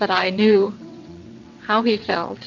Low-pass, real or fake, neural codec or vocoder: 7.2 kHz; fake; vocoder, 22.05 kHz, 80 mel bands, WaveNeXt